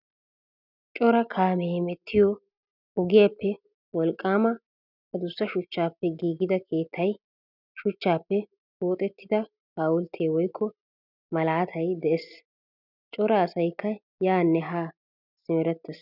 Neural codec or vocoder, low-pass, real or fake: none; 5.4 kHz; real